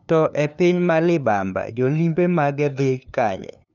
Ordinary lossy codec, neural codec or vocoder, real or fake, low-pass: none; codec, 16 kHz, 2 kbps, FunCodec, trained on LibriTTS, 25 frames a second; fake; 7.2 kHz